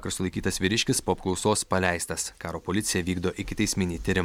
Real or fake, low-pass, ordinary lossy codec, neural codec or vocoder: real; 19.8 kHz; MP3, 96 kbps; none